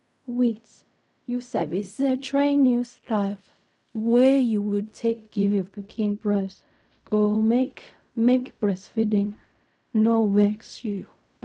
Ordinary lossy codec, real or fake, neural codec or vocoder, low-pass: none; fake; codec, 16 kHz in and 24 kHz out, 0.4 kbps, LongCat-Audio-Codec, fine tuned four codebook decoder; 10.8 kHz